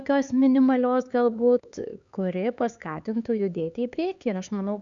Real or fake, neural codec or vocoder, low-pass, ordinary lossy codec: fake; codec, 16 kHz, 4 kbps, X-Codec, HuBERT features, trained on LibriSpeech; 7.2 kHz; Opus, 64 kbps